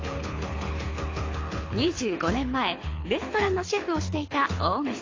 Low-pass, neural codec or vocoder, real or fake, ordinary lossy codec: 7.2 kHz; codec, 24 kHz, 6 kbps, HILCodec; fake; AAC, 32 kbps